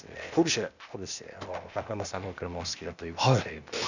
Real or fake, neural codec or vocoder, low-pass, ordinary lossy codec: fake; codec, 16 kHz, 0.8 kbps, ZipCodec; 7.2 kHz; none